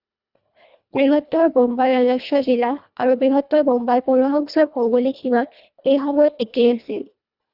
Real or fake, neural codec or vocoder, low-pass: fake; codec, 24 kHz, 1.5 kbps, HILCodec; 5.4 kHz